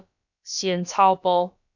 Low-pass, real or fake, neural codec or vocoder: 7.2 kHz; fake; codec, 16 kHz, about 1 kbps, DyCAST, with the encoder's durations